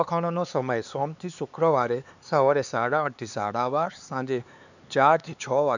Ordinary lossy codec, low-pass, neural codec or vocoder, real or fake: none; 7.2 kHz; codec, 16 kHz, 4 kbps, X-Codec, HuBERT features, trained on LibriSpeech; fake